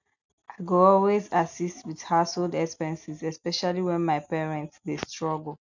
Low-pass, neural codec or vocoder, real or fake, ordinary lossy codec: 7.2 kHz; none; real; none